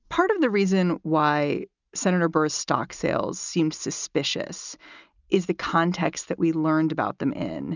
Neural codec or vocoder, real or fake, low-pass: none; real; 7.2 kHz